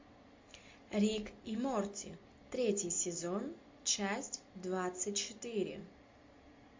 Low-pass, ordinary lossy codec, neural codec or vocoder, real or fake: 7.2 kHz; MP3, 48 kbps; none; real